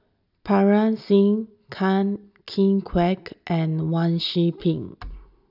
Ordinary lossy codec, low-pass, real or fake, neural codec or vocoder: none; 5.4 kHz; real; none